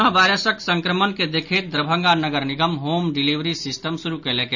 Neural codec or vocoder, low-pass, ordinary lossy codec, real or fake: none; 7.2 kHz; none; real